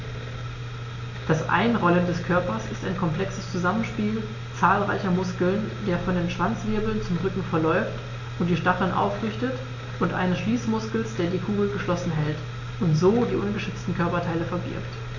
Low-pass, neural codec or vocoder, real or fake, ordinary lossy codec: 7.2 kHz; none; real; none